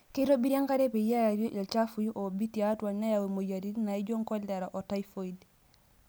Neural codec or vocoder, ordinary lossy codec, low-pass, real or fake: none; none; none; real